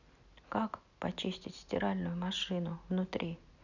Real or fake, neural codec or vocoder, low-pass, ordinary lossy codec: real; none; 7.2 kHz; none